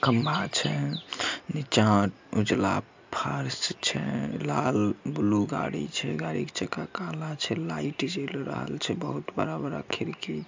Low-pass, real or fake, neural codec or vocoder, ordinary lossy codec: 7.2 kHz; real; none; MP3, 64 kbps